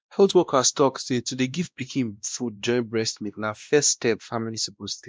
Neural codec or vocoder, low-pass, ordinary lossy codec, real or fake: codec, 16 kHz, 1 kbps, X-Codec, WavLM features, trained on Multilingual LibriSpeech; none; none; fake